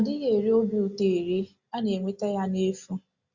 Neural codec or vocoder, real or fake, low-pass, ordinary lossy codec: none; real; 7.2 kHz; Opus, 64 kbps